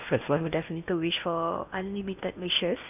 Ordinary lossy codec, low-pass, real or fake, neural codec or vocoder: none; 3.6 kHz; fake; codec, 16 kHz in and 24 kHz out, 0.8 kbps, FocalCodec, streaming, 65536 codes